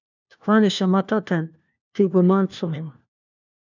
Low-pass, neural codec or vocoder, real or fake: 7.2 kHz; codec, 16 kHz, 1 kbps, FunCodec, trained on LibriTTS, 50 frames a second; fake